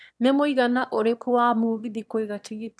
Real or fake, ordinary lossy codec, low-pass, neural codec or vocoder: fake; none; none; autoencoder, 22.05 kHz, a latent of 192 numbers a frame, VITS, trained on one speaker